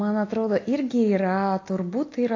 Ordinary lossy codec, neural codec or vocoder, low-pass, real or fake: AAC, 32 kbps; none; 7.2 kHz; real